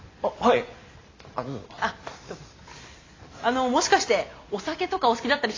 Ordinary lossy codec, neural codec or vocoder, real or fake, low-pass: AAC, 32 kbps; none; real; 7.2 kHz